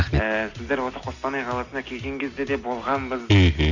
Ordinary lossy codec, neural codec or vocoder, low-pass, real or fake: none; none; 7.2 kHz; real